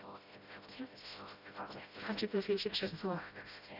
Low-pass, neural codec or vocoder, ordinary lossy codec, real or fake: 5.4 kHz; codec, 16 kHz, 0.5 kbps, FreqCodec, smaller model; Opus, 64 kbps; fake